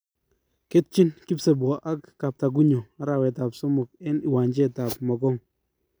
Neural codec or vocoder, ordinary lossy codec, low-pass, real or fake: none; none; none; real